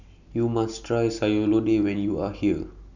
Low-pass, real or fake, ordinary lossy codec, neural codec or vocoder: 7.2 kHz; real; none; none